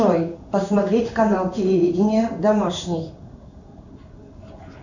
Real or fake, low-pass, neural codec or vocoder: fake; 7.2 kHz; codec, 16 kHz in and 24 kHz out, 1 kbps, XY-Tokenizer